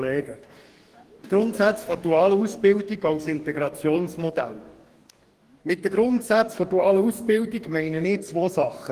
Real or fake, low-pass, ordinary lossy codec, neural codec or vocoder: fake; 14.4 kHz; Opus, 24 kbps; codec, 44.1 kHz, 2.6 kbps, DAC